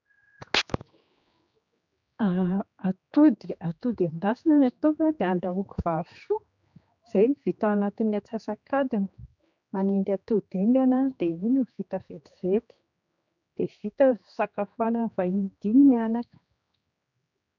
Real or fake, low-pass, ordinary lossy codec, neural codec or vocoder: fake; 7.2 kHz; none; codec, 16 kHz, 2 kbps, X-Codec, HuBERT features, trained on general audio